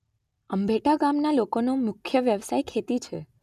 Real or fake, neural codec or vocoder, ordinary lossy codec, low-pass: real; none; none; 14.4 kHz